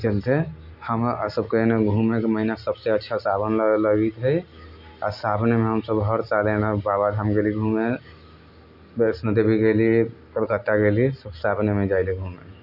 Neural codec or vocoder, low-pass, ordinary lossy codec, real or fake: none; 5.4 kHz; none; real